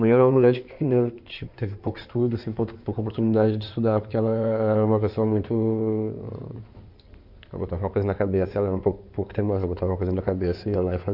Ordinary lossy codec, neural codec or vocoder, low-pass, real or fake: none; codec, 16 kHz in and 24 kHz out, 2.2 kbps, FireRedTTS-2 codec; 5.4 kHz; fake